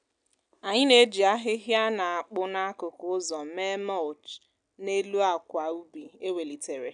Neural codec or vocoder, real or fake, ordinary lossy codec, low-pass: none; real; none; 9.9 kHz